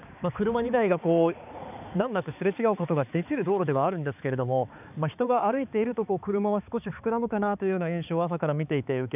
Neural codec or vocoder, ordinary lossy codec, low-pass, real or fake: codec, 16 kHz, 4 kbps, X-Codec, HuBERT features, trained on balanced general audio; none; 3.6 kHz; fake